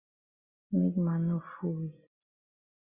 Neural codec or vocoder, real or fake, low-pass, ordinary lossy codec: none; real; 3.6 kHz; Opus, 64 kbps